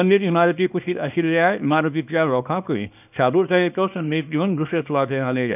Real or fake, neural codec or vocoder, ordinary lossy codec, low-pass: fake; codec, 24 kHz, 0.9 kbps, WavTokenizer, small release; none; 3.6 kHz